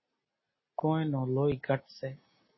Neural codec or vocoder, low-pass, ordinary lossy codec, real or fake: none; 7.2 kHz; MP3, 24 kbps; real